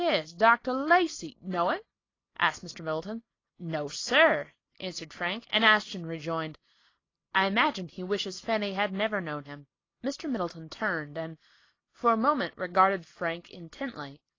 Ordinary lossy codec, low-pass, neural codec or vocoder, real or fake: AAC, 32 kbps; 7.2 kHz; none; real